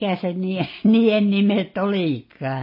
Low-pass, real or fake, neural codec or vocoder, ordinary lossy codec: 5.4 kHz; real; none; MP3, 24 kbps